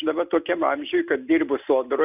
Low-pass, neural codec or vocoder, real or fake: 3.6 kHz; none; real